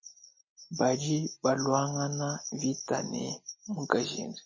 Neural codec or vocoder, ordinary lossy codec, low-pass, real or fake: none; MP3, 32 kbps; 7.2 kHz; real